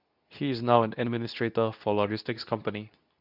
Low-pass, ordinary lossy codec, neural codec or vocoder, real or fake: 5.4 kHz; none; codec, 24 kHz, 0.9 kbps, WavTokenizer, medium speech release version 2; fake